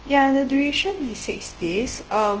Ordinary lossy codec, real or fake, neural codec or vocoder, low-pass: Opus, 16 kbps; fake; codec, 24 kHz, 0.9 kbps, WavTokenizer, large speech release; 7.2 kHz